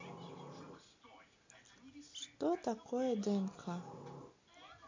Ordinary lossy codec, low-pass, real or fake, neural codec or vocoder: MP3, 48 kbps; 7.2 kHz; real; none